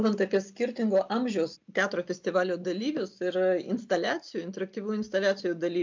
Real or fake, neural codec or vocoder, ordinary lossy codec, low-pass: real; none; MP3, 64 kbps; 7.2 kHz